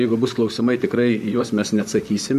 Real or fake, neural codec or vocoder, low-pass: fake; vocoder, 44.1 kHz, 128 mel bands, Pupu-Vocoder; 14.4 kHz